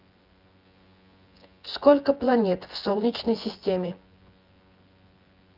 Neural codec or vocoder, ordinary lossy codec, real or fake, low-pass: vocoder, 24 kHz, 100 mel bands, Vocos; Opus, 32 kbps; fake; 5.4 kHz